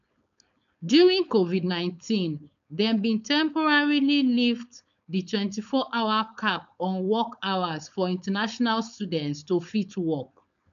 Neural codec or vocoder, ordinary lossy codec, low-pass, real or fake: codec, 16 kHz, 4.8 kbps, FACodec; none; 7.2 kHz; fake